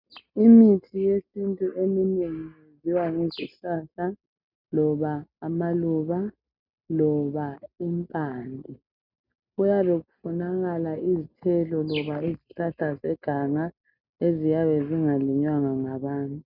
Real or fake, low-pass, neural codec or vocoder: real; 5.4 kHz; none